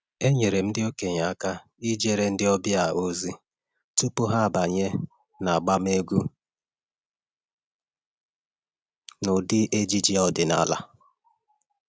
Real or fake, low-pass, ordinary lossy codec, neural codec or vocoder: real; none; none; none